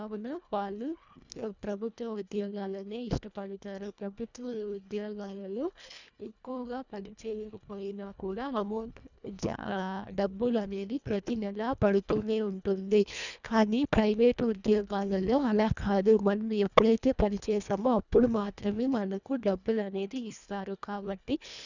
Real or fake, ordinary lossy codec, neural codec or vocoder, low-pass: fake; none; codec, 24 kHz, 1.5 kbps, HILCodec; 7.2 kHz